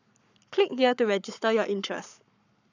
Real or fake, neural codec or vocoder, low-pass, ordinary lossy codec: fake; codec, 44.1 kHz, 7.8 kbps, Pupu-Codec; 7.2 kHz; none